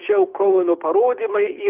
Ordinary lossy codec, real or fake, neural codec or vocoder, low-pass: Opus, 32 kbps; fake; codec, 24 kHz, 6 kbps, HILCodec; 3.6 kHz